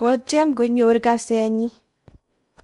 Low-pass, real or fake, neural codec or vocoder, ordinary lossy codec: 10.8 kHz; fake; codec, 16 kHz in and 24 kHz out, 0.6 kbps, FocalCodec, streaming, 2048 codes; none